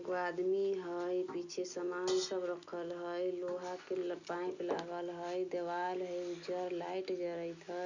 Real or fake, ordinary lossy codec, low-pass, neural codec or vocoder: real; none; 7.2 kHz; none